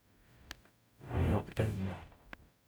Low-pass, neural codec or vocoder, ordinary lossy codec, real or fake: none; codec, 44.1 kHz, 0.9 kbps, DAC; none; fake